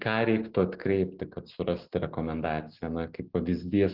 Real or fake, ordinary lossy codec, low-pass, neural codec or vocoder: real; Opus, 16 kbps; 5.4 kHz; none